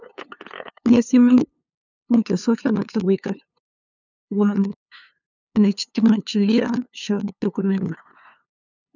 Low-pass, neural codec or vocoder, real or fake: 7.2 kHz; codec, 16 kHz, 2 kbps, FunCodec, trained on LibriTTS, 25 frames a second; fake